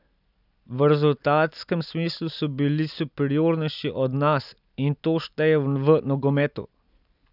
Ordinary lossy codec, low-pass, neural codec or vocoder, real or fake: none; 5.4 kHz; none; real